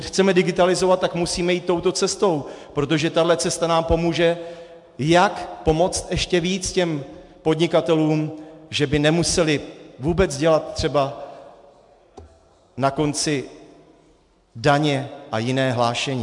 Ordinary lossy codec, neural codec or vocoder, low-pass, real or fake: MP3, 64 kbps; none; 10.8 kHz; real